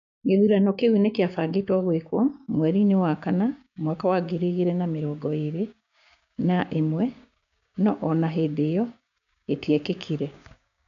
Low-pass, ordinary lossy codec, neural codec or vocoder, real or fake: 7.2 kHz; none; codec, 16 kHz, 6 kbps, DAC; fake